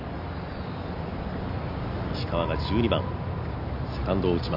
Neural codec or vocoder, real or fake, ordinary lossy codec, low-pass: none; real; none; 5.4 kHz